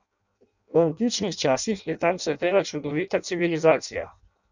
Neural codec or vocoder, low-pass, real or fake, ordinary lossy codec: codec, 16 kHz in and 24 kHz out, 0.6 kbps, FireRedTTS-2 codec; 7.2 kHz; fake; none